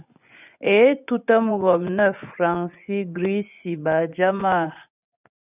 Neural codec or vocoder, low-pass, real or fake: vocoder, 24 kHz, 100 mel bands, Vocos; 3.6 kHz; fake